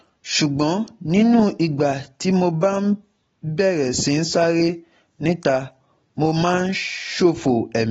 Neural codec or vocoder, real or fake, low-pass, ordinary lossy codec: none; real; 19.8 kHz; AAC, 24 kbps